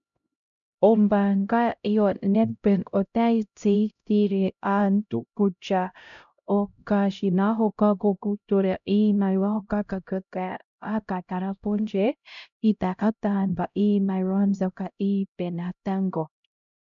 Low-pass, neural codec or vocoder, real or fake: 7.2 kHz; codec, 16 kHz, 0.5 kbps, X-Codec, HuBERT features, trained on LibriSpeech; fake